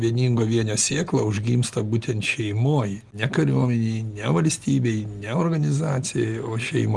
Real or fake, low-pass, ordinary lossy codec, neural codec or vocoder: real; 10.8 kHz; Opus, 24 kbps; none